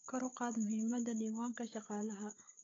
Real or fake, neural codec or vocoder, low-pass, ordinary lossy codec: fake; codec, 16 kHz, 16 kbps, FunCodec, trained on Chinese and English, 50 frames a second; 7.2 kHz; AAC, 32 kbps